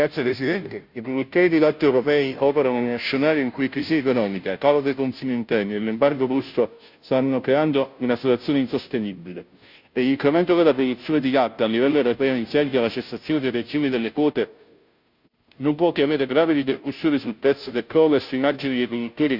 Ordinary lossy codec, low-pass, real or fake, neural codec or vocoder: none; 5.4 kHz; fake; codec, 16 kHz, 0.5 kbps, FunCodec, trained on Chinese and English, 25 frames a second